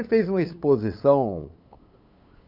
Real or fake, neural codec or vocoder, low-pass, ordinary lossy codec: fake; codec, 16 kHz, 8 kbps, FunCodec, trained on LibriTTS, 25 frames a second; 5.4 kHz; none